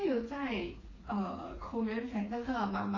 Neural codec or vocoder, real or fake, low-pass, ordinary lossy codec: codec, 16 kHz, 4 kbps, FreqCodec, smaller model; fake; 7.2 kHz; AAC, 32 kbps